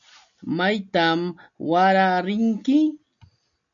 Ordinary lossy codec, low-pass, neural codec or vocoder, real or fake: MP3, 96 kbps; 7.2 kHz; none; real